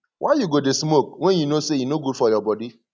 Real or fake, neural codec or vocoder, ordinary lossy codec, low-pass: real; none; none; none